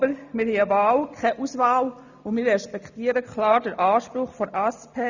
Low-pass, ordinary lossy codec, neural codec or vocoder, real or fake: 7.2 kHz; none; none; real